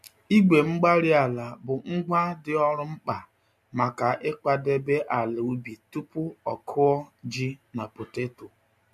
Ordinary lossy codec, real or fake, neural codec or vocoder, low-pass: MP3, 64 kbps; real; none; 14.4 kHz